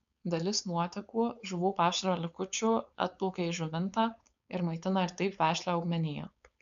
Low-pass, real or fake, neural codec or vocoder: 7.2 kHz; fake; codec, 16 kHz, 4.8 kbps, FACodec